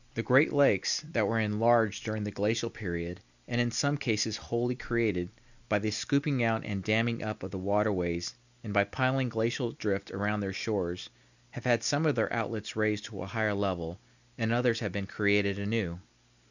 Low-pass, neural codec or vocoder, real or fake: 7.2 kHz; none; real